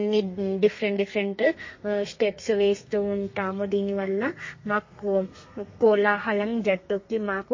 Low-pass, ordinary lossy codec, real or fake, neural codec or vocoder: 7.2 kHz; MP3, 32 kbps; fake; codec, 32 kHz, 1.9 kbps, SNAC